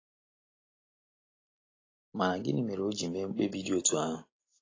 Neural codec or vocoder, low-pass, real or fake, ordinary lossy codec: none; 7.2 kHz; real; AAC, 32 kbps